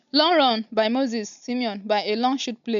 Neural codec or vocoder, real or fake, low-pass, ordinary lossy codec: none; real; 7.2 kHz; none